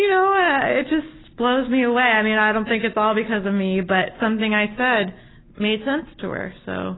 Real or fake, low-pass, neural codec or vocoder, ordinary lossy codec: real; 7.2 kHz; none; AAC, 16 kbps